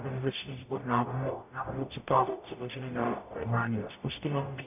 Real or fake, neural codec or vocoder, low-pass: fake; codec, 44.1 kHz, 0.9 kbps, DAC; 3.6 kHz